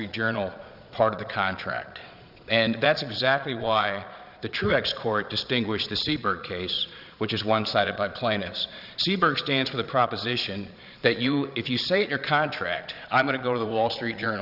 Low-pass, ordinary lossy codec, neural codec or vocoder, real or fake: 5.4 kHz; Opus, 64 kbps; vocoder, 22.05 kHz, 80 mel bands, Vocos; fake